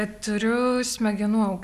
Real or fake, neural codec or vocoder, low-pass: real; none; 14.4 kHz